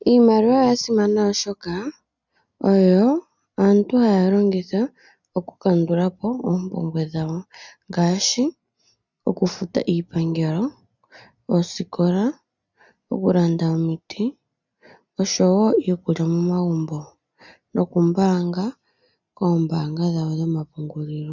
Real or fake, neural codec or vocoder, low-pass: real; none; 7.2 kHz